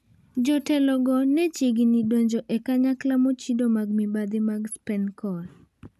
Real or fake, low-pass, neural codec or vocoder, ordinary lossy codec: real; 14.4 kHz; none; none